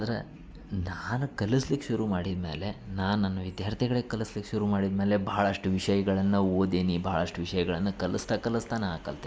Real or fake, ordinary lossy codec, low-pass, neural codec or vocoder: real; none; none; none